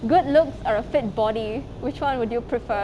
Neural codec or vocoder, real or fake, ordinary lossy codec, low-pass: none; real; none; none